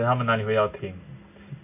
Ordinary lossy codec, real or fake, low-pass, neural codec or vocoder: MP3, 32 kbps; real; 3.6 kHz; none